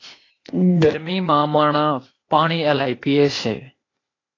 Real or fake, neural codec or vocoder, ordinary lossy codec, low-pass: fake; codec, 16 kHz, 0.8 kbps, ZipCodec; AAC, 32 kbps; 7.2 kHz